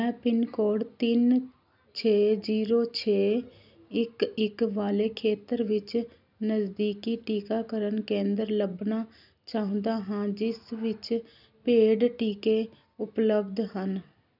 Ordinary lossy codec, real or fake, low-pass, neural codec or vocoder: none; real; 5.4 kHz; none